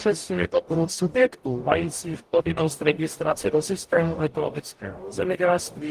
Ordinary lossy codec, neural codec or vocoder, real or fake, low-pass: Opus, 24 kbps; codec, 44.1 kHz, 0.9 kbps, DAC; fake; 14.4 kHz